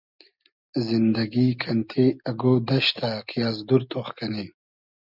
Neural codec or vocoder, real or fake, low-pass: none; real; 5.4 kHz